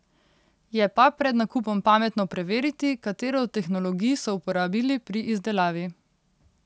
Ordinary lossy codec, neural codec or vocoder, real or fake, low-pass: none; none; real; none